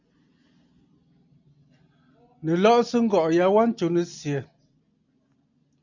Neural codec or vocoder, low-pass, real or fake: none; 7.2 kHz; real